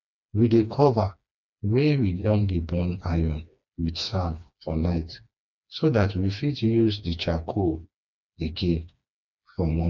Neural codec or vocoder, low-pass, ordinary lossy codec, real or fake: codec, 16 kHz, 2 kbps, FreqCodec, smaller model; 7.2 kHz; none; fake